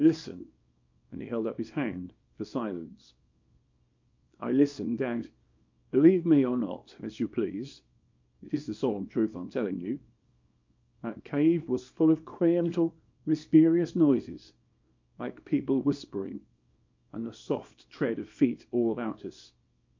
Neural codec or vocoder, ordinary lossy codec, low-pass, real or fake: codec, 24 kHz, 0.9 kbps, WavTokenizer, small release; MP3, 48 kbps; 7.2 kHz; fake